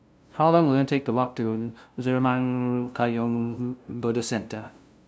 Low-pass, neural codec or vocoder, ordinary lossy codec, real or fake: none; codec, 16 kHz, 0.5 kbps, FunCodec, trained on LibriTTS, 25 frames a second; none; fake